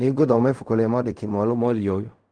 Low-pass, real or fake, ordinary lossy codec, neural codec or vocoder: 9.9 kHz; fake; Opus, 32 kbps; codec, 16 kHz in and 24 kHz out, 0.4 kbps, LongCat-Audio-Codec, fine tuned four codebook decoder